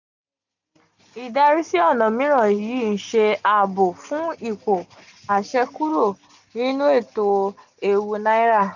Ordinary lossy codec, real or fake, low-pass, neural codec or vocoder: none; real; 7.2 kHz; none